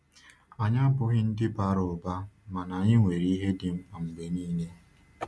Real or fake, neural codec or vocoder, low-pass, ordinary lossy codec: real; none; none; none